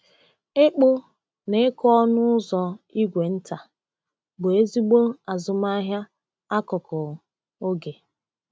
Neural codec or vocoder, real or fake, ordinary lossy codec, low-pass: none; real; none; none